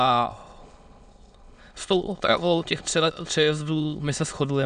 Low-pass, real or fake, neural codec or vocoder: 9.9 kHz; fake; autoencoder, 22.05 kHz, a latent of 192 numbers a frame, VITS, trained on many speakers